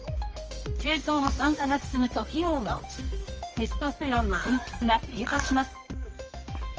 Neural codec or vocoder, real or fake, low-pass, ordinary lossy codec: codec, 24 kHz, 0.9 kbps, WavTokenizer, medium music audio release; fake; 7.2 kHz; Opus, 24 kbps